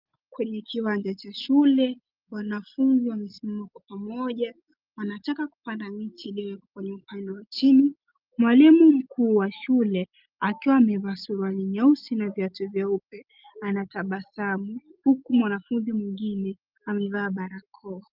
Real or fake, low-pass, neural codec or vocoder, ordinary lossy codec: real; 5.4 kHz; none; Opus, 24 kbps